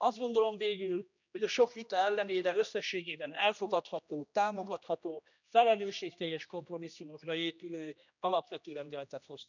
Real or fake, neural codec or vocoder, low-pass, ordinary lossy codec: fake; codec, 16 kHz, 1 kbps, X-Codec, HuBERT features, trained on general audio; 7.2 kHz; none